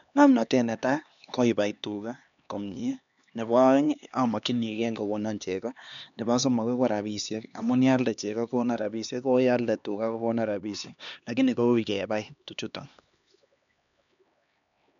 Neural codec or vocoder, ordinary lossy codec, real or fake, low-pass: codec, 16 kHz, 2 kbps, X-Codec, HuBERT features, trained on LibriSpeech; MP3, 96 kbps; fake; 7.2 kHz